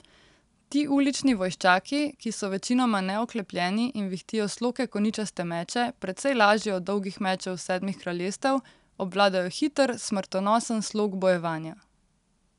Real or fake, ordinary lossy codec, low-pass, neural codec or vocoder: real; none; 10.8 kHz; none